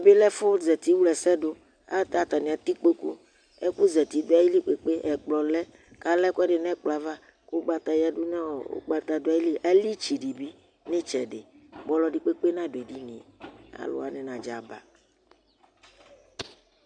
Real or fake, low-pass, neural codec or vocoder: real; 9.9 kHz; none